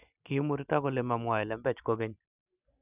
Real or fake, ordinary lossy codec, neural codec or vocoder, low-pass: real; none; none; 3.6 kHz